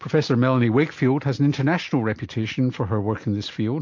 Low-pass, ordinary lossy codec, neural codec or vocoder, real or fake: 7.2 kHz; MP3, 48 kbps; none; real